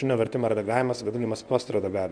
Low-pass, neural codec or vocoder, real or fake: 9.9 kHz; codec, 24 kHz, 0.9 kbps, WavTokenizer, medium speech release version 1; fake